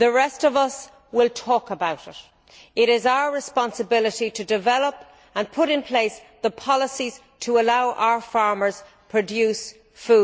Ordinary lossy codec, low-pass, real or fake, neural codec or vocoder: none; none; real; none